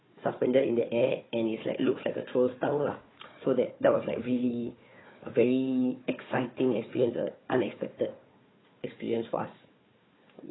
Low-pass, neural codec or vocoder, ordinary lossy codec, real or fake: 7.2 kHz; codec, 16 kHz, 4 kbps, FunCodec, trained on Chinese and English, 50 frames a second; AAC, 16 kbps; fake